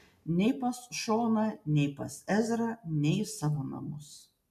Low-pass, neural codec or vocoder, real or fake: 14.4 kHz; none; real